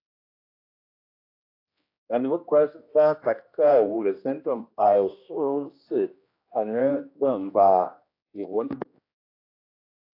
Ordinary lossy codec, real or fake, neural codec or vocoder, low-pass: AAC, 32 kbps; fake; codec, 16 kHz, 1 kbps, X-Codec, HuBERT features, trained on general audio; 5.4 kHz